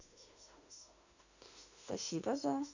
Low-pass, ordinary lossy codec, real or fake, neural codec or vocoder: 7.2 kHz; none; fake; autoencoder, 48 kHz, 32 numbers a frame, DAC-VAE, trained on Japanese speech